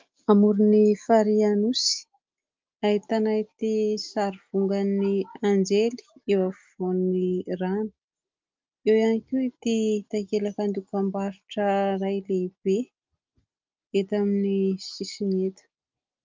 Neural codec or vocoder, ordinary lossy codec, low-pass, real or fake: none; Opus, 24 kbps; 7.2 kHz; real